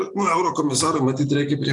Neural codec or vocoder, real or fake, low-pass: none; real; 10.8 kHz